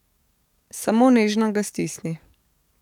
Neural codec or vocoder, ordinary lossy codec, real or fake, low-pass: codec, 44.1 kHz, 7.8 kbps, DAC; none; fake; 19.8 kHz